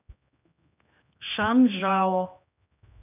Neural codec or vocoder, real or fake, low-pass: codec, 16 kHz, 2 kbps, X-Codec, HuBERT features, trained on general audio; fake; 3.6 kHz